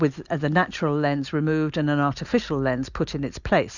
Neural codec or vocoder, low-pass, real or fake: none; 7.2 kHz; real